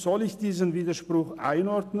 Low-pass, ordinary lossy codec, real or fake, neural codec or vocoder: 14.4 kHz; none; real; none